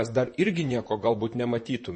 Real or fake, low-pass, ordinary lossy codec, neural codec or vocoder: fake; 10.8 kHz; MP3, 32 kbps; vocoder, 44.1 kHz, 128 mel bands, Pupu-Vocoder